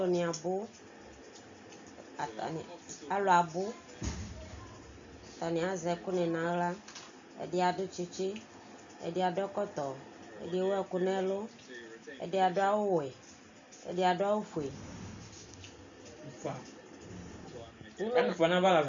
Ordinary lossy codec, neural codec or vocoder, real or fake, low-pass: AAC, 64 kbps; none; real; 7.2 kHz